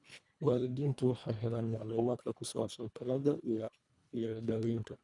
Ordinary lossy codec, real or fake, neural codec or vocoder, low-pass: none; fake; codec, 24 kHz, 1.5 kbps, HILCodec; 10.8 kHz